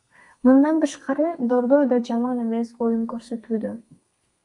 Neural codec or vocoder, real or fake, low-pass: codec, 32 kHz, 1.9 kbps, SNAC; fake; 10.8 kHz